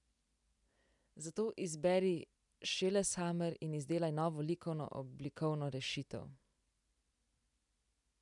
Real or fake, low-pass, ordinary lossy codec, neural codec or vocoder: real; 10.8 kHz; none; none